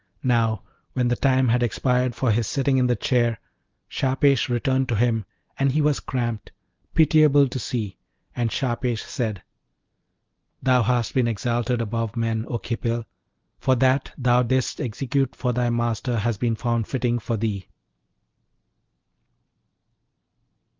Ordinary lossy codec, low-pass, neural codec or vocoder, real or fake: Opus, 24 kbps; 7.2 kHz; none; real